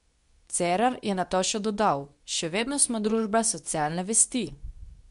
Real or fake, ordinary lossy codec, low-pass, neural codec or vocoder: fake; none; 10.8 kHz; codec, 24 kHz, 0.9 kbps, WavTokenizer, medium speech release version 2